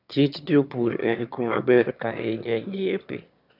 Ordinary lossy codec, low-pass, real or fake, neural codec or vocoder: none; 5.4 kHz; fake; autoencoder, 22.05 kHz, a latent of 192 numbers a frame, VITS, trained on one speaker